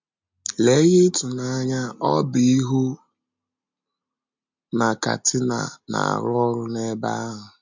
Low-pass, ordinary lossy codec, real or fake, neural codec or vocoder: 7.2 kHz; MP3, 64 kbps; real; none